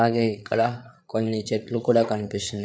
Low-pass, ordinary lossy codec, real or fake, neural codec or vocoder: none; none; fake; codec, 16 kHz, 8 kbps, FreqCodec, larger model